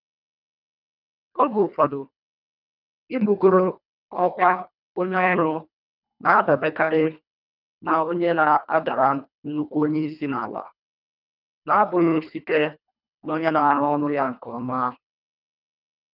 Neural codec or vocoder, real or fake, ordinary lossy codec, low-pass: codec, 24 kHz, 1.5 kbps, HILCodec; fake; none; 5.4 kHz